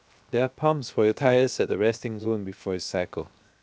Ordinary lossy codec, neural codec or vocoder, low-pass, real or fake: none; codec, 16 kHz, 0.7 kbps, FocalCodec; none; fake